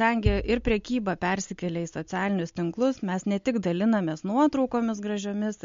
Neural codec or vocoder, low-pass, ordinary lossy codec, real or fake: none; 7.2 kHz; MP3, 48 kbps; real